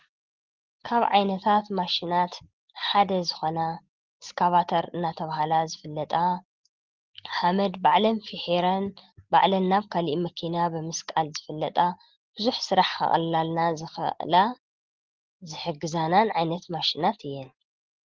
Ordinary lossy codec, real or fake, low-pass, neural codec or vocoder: Opus, 16 kbps; real; 7.2 kHz; none